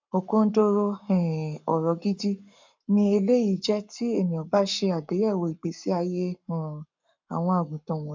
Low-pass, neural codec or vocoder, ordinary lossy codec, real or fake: 7.2 kHz; codec, 44.1 kHz, 7.8 kbps, Pupu-Codec; MP3, 64 kbps; fake